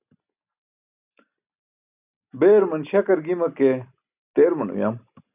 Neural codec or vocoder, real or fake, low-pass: none; real; 3.6 kHz